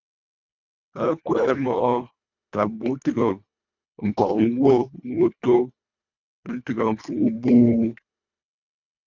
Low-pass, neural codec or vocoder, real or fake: 7.2 kHz; codec, 24 kHz, 1.5 kbps, HILCodec; fake